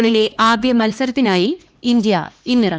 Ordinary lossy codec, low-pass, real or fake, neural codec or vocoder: none; none; fake; codec, 16 kHz, 2 kbps, X-Codec, HuBERT features, trained on LibriSpeech